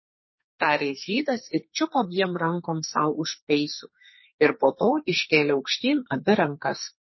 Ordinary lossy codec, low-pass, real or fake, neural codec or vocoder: MP3, 24 kbps; 7.2 kHz; fake; codec, 44.1 kHz, 2.6 kbps, SNAC